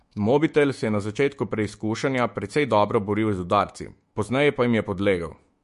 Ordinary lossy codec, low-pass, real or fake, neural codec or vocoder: MP3, 48 kbps; 14.4 kHz; fake; autoencoder, 48 kHz, 128 numbers a frame, DAC-VAE, trained on Japanese speech